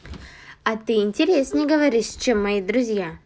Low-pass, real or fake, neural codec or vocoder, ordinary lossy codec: none; real; none; none